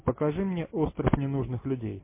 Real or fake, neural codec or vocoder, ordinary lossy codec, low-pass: real; none; MP3, 24 kbps; 3.6 kHz